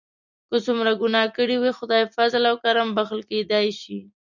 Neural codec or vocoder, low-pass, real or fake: none; 7.2 kHz; real